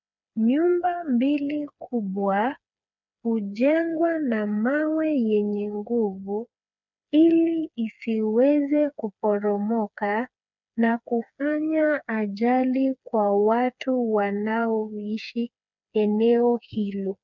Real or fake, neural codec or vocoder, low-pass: fake; codec, 16 kHz, 4 kbps, FreqCodec, smaller model; 7.2 kHz